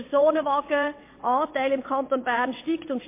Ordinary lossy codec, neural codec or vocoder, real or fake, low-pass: MP3, 24 kbps; vocoder, 22.05 kHz, 80 mel bands, WaveNeXt; fake; 3.6 kHz